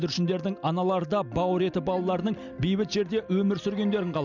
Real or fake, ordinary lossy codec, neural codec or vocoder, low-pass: real; none; none; 7.2 kHz